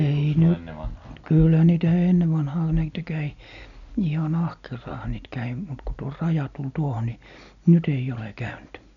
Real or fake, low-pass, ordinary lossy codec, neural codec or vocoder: real; 7.2 kHz; none; none